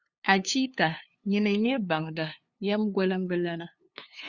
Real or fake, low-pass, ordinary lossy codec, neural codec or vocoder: fake; 7.2 kHz; Opus, 64 kbps; codec, 16 kHz, 2 kbps, FunCodec, trained on LibriTTS, 25 frames a second